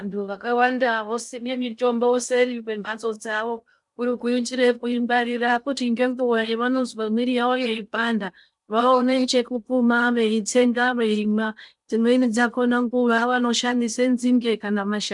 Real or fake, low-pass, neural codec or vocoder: fake; 10.8 kHz; codec, 16 kHz in and 24 kHz out, 0.6 kbps, FocalCodec, streaming, 4096 codes